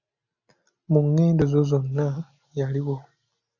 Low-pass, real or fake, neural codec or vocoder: 7.2 kHz; real; none